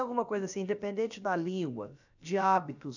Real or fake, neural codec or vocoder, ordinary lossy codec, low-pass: fake; codec, 16 kHz, about 1 kbps, DyCAST, with the encoder's durations; none; 7.2 kHz